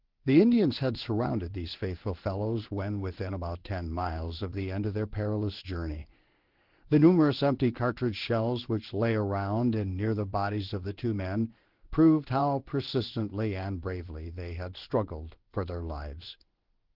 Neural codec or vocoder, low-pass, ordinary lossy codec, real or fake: codec, 16 kHz in and 24 kHz out, 1 kbps, XY-Tokenizer; 5.4 kHz; Opus, 16 kbps; fake